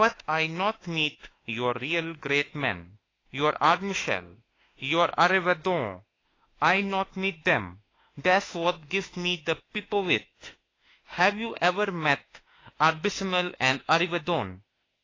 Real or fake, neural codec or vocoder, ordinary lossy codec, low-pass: fake; autoencoder, 48 kHz, 32 numbers a frame, DAC-VAE, trained on Japanese speech; AAC, 32 kbps; 7.2 kHz